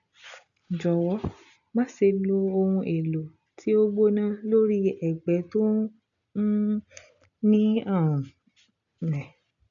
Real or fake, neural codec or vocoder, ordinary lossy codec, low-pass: real; none; none; 7.2 kHz